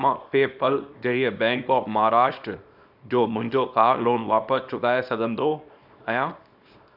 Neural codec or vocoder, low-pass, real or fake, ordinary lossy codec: codec, 24 kHz, 0.9 kbps, WavTokenizer, small release; 5.4 kHz; fake; none